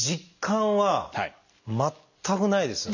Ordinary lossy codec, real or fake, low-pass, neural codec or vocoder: none; real; 7.2 kHz; none